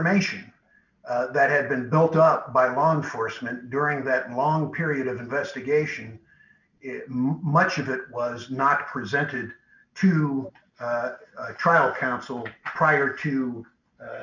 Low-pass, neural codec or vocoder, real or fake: 7.2 kHz; none; real